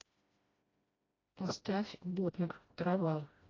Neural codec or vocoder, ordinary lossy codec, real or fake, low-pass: codec, 16 kHz, 1 kbps, FreqCodec, smaller model; AAC, 32 kbps; fake; 7.2 kHz